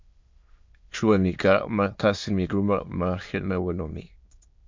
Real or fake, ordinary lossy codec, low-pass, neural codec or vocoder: fake; MP3, 64 kbps; 7.2 kHz; autoencoder, 22.05 kHz, a latent of 192 numbers a frame, VITS, trained on many speakers